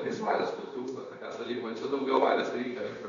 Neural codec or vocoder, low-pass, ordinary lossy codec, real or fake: codec, 16 kHz, 0.9 kbps, LongCat-Audio-Codec; 7.2 kHz; Opus, 64 kbps; fake